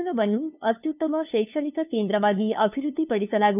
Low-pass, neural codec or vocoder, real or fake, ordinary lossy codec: 3.6 kHz; codec, 16 kHz, 2 kbps, FunCodec, trained on LibriTTS, 25 frames a second; fake; none